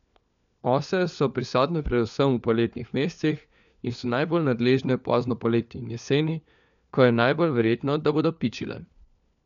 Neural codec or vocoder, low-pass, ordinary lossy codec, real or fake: codec, 16 kHz, 4 kbps, FunCodec, trained on LibriTTS, 50 frames a second; 7.2 kHz; none; fake